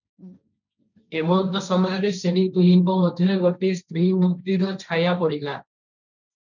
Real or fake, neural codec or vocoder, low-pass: fake; codec, 16 kHz, 1.1 kbps, Voila-Tokenizer; 7.2 kHz